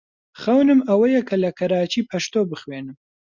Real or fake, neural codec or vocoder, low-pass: real; none; 7.2 kHz